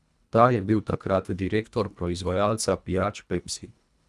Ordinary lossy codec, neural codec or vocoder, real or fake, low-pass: none; codec, 24 kHz, 1.5 kbps, HILCodec; fake; none